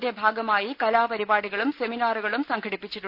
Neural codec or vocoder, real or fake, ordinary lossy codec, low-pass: none; real; Opus, 64 kbps; 5.4 kHz